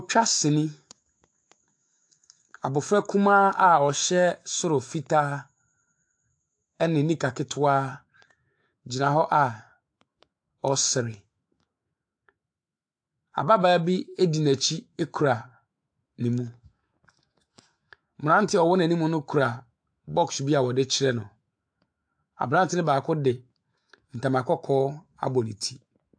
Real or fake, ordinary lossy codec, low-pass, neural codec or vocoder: fake; AAC, 64 kbps; 9.9 kHz; autoencoder, 48 kHz, 128 numbers a frame, DAC-VAE, trained on Japanese speech